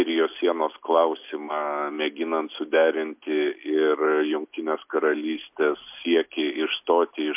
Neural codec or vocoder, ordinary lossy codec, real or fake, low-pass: none; MP3, 32 kbps; real; 3.6 kHz